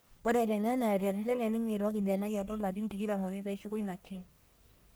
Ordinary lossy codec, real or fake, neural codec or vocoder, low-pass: none; fake; codec, 44.1 kHz, 1.7 kbps, Pupu-Codec; none